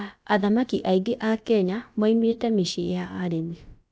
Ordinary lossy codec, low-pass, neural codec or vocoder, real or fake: none; none; codec, 16 kHz, about 1 kbps, DyCAST, with the encoder's durations; fake